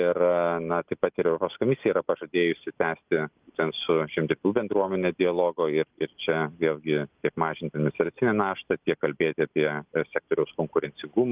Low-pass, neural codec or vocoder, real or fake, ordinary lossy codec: 3.6 kHz; none; real; Opus, 24 kbps